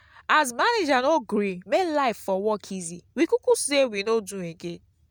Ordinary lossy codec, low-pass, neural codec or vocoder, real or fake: none; none; none; real